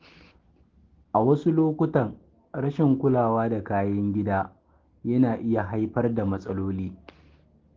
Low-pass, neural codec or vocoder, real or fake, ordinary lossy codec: 7.2 kHz; none; real; Opus, 16 kbps